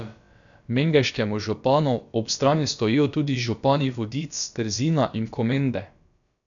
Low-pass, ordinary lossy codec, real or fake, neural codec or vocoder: 7.2 kHz; none; fake; codec, 16 kHz, about 1 kbps, DyCAST, with the encoder's durations